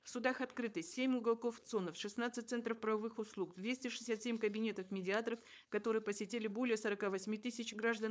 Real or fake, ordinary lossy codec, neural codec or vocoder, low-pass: fake; none; codec, 16 kHz, 4.8 kbps, FACodec; none